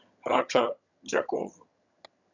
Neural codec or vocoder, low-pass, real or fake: vocoder, 22.05 kHz, 80 mel bands, HiFi-GAN; 7.2 kHz; fake